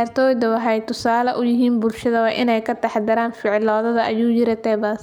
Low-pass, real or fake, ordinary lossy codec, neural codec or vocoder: 19.8 kHz; real; none; none